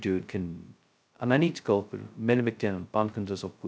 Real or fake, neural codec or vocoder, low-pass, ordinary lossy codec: fake; codec, 16 kHz, 0.2 kbps, FocalCodec; none; none